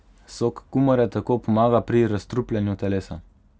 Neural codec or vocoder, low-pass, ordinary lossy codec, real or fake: none; none; none; real